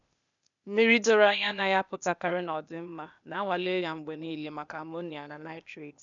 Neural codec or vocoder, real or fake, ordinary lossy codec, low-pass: codec, 16 kHz, 0.8 kbps, ZipCodec; fake; none; 7.2 kHz